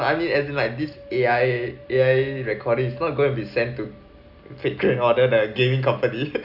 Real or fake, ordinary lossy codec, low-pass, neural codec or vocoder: real; AAC, 48 kbps; 5.4 kHz; none